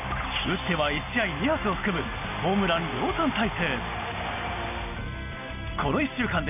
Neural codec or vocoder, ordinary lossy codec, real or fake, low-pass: none; none; real; 3.6 kHz